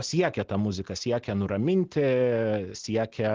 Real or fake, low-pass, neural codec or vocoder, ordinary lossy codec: real; 7.2 kHz; none; Opus, 16 kbps